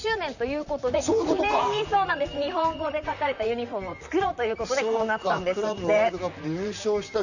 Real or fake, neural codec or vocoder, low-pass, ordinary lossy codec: fake; vocoder, 44.1 kHz, 128 mel bands, Pupu-Vocoder; 7.2 kHz; none